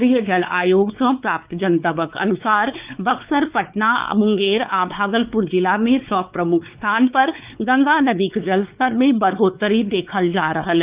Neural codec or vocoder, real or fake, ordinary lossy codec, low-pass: codec, 16 kHz, 4 kbps, X-Codec, WavLM features, trained on Multilingual LibriSpeech; fake; Opus, 24 kbps; 3.6 kHz